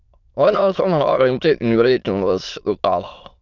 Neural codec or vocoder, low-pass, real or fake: autoencoder, 22.05 kHz, a latent of 192 numbers a frame, VITS, trained on many speakers; 7.2 kHz; fake